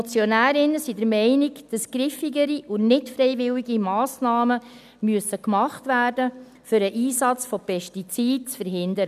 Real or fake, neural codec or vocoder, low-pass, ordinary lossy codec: real; none; 14.4 kHz; none